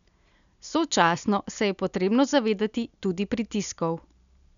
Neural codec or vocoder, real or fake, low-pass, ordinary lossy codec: none; real; 7.2 kHz; none